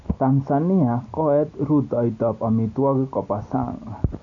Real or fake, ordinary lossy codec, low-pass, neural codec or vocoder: real; none; 7.2 kHz; none